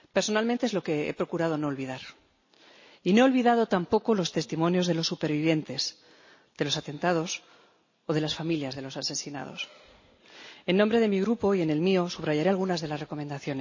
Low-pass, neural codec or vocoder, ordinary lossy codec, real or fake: 7.2 kHz; none; MP3, 32 kbps; real